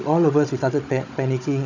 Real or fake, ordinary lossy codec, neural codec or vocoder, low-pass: fake; none; codec, 16 kHz, 16 kbps, FunCodec, trained on Chinese and English, 50 frames a second; 7.2 kHz